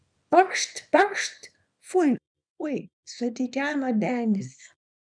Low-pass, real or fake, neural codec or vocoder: 9.9 kHz; fake; codec, 24 kHz, 0.9 kbps, WavTokenizer, small release